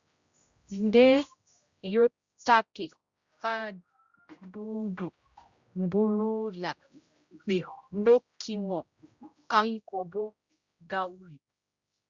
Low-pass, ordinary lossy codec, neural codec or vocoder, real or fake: 7.2 kHz; Opus, 64 kbps; codec, 16 kHz, 0.5 kbps, X-Codec, HuBERT features, trained on general audio; fake